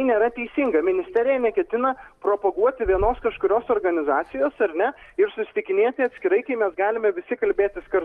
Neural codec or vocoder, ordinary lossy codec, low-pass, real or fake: none; Opus, 32 kbps; 14.4 kHz; real